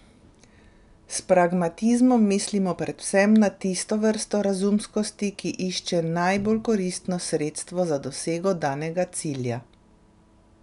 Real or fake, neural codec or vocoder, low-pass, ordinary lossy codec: real; none; 10.8 kHz; none